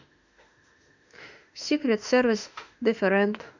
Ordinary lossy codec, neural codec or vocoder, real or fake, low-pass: none; autoencoder, 48 kHz, 32 numbers a frame, DAC-VAE, trained on Japanese speech; fake; 7.2 kHz